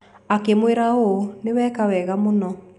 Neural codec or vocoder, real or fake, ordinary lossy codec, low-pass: none; real; none; 10.8 kHz